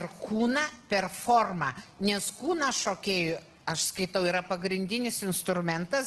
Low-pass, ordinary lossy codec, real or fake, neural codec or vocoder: 10.8 kHz; Opus, 16 kbps; real; none